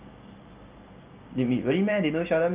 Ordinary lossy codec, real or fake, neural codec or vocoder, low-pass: Opus, 32 kbps; real; none; 3.6 kHz